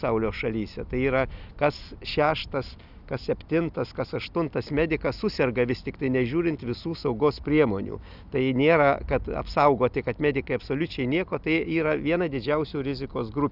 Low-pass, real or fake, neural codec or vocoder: 5.4 kHz; real; none